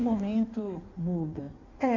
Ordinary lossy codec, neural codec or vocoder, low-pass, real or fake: none; codec, 16 kHz in and 24 kHz out, 1.1 kbps, FireRedTTS-2 codec; 7.2 kHz; fake